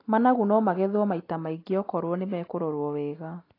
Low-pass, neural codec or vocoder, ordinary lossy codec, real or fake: 5.4 kHz; none; AAC, 24 kbps; real